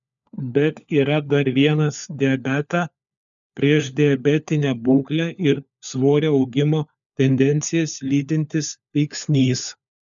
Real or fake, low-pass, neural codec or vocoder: fake; 7.2 kHz; codec, 16 kHz, 4 kbps, FunCodec, trained on LibriTTS, 50 frames a second